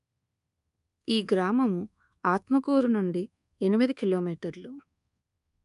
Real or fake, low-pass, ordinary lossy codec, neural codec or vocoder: fake; 10.8 kHz; none; codec, 24 kHz, 1.2 kbps, DualCodec